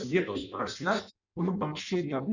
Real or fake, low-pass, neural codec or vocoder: fake; 7.2 kHz; codec, 16 kHz in and 24 kHz out, 0.6 kbps, FireRedTTS-2 codec